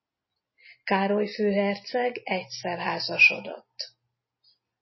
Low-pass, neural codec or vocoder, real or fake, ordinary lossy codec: 7.2 kHz; none; real; MP3, 24 kbps